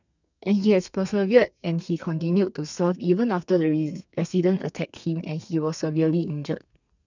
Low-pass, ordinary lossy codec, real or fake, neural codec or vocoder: 7.2 kHz; none; fake; codec, 32 kHz, 1.9 kbps, SNAC